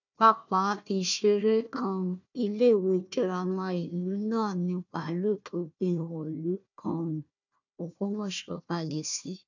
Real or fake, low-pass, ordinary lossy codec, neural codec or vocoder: fake; 7.2 kHz; none; codec, 16 kHz, 1 kbps, FunCodec, trained on Chinese and English, 50 frames a second